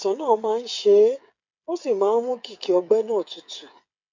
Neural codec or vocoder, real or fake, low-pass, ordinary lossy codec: codec, 16 kHz, 8 kbps, FreqCodec, smaller model; fake; 7.2 kHz; MP3, 64 kbps